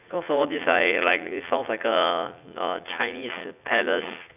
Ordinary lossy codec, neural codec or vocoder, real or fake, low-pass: none; vocoder, 44.1 kHz, 80 mel bands, Vocos; fake; 3.6 kHz